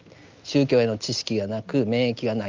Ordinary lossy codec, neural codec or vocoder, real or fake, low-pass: Opus, 24 kbps; none; real; 7.2 kHz